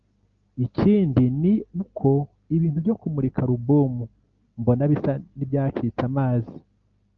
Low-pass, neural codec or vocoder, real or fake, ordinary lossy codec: 7.2 kHz; none; real; Opus, 24 kbps